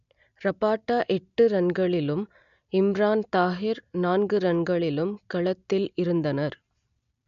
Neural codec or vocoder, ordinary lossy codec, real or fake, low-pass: none; none; real; 7.2 kHz